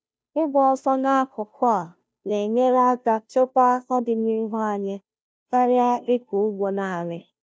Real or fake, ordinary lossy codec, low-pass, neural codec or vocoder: fake; none; none; codec, 16 kHz, 0.5 kbps, FunCodec, trained on Chinese and English, 25 frames a second